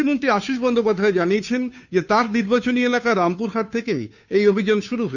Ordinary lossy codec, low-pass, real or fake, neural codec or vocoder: none; 7.2 kHz; fake; codec, 16 kHz, 2 kbps, FunCodec, trained on Chinese and English, 25 frames a second